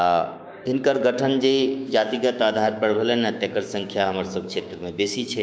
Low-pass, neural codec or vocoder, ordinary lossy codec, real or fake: none; codec, 16 kHz, 6 kbps, DAC; none; fake